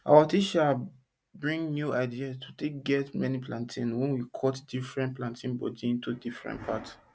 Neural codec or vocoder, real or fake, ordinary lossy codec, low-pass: none; real; none; none